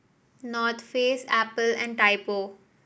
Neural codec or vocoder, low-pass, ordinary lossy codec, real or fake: none; none; none; real